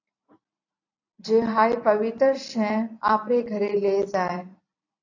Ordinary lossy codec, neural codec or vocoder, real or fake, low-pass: AAC, 48 kbps; vocoder, 44.1 kHz, 128 mel bands every 256 samples, BigVGAN v2; fake; 7.2 kHz